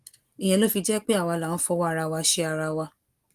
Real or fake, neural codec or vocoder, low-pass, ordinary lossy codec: real; none; 14.4 kHz; Opus, 24 kbps